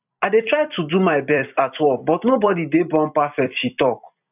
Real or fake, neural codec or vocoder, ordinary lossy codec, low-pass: fake; vocoder, 44.1 kHz, 128 mel bands every 256 samples, BigVGAN v2; none; 3.6 kHz